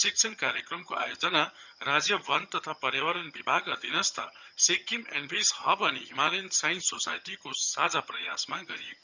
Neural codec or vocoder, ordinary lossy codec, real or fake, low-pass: vocoder, 22.05 kHz, 80 mel bands, HiFi-GAN; none; fake; 7.2 kHz